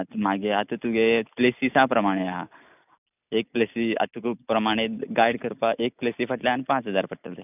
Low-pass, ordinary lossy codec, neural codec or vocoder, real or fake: 3.6 kHz; none; none; real